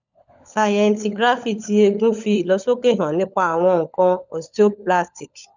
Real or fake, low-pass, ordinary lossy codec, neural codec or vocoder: fake; 7.2 kHz; none; codec, 16 kHz, 16 kbps, FunCodec, trained on LibriTTS, 50 frames a second